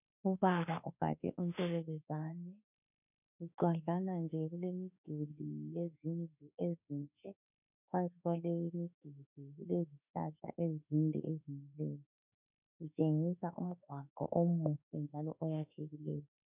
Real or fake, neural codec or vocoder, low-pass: fake; autoencoder, 48 kHz, 32 numbers a frame, DAC-VAE, trained on Japanese speech; 3.6 kHz